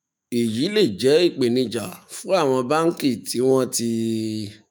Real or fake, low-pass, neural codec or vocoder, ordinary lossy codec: fake; none; autoencoder, 48 kHz, 128 numbers a frame, DAC-VAE, trained on Japanese speech; none